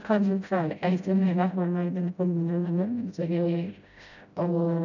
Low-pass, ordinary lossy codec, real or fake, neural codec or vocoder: 7.2 kHz; none; fake; codec, 16 kHz, 0.5 kbps, FreqCodec, smaller model